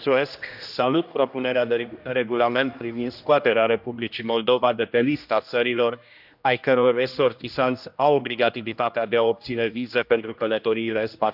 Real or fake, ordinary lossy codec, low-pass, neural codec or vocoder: fake; none; 5.4 kHz; codec, 16 kHz, 1 kbps, X-Codec, HuBERT features, trained on general audio